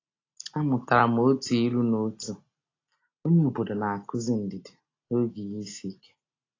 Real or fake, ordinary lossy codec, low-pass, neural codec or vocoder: real; AAC, 32 kbps; 7.2 kHz; none